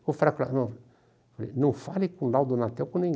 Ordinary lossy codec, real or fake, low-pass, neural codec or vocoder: none; real; none; none